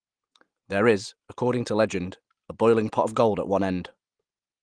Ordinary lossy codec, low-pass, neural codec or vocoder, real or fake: Opus, 24 kbps; 9.9 kHz; none; real